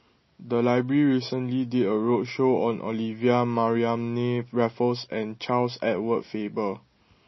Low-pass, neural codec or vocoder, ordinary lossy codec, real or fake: 7.2 kHz; none; MP3, 24 kbps; real